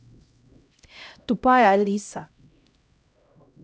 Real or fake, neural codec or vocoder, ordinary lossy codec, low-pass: fake; codec, 16 kHz, 0.5 kbps, X-Codec, HuBERT features, trained on LibriSpeech; none; none